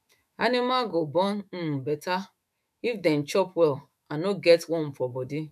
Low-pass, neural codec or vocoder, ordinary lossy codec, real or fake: 14.4 kHz; autoencoder, 48 kHz, 128 numbers a frame, DAC-VAE, trained on Japanese speech; none; fake